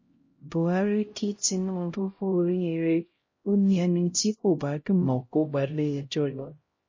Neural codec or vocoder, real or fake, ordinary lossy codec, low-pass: codec, 16 kHz, 0.5 kbps, X-Codec, HuBERT features, trained on LibriSpeech; fake; MP3, 32 kbps; 7.2 kHz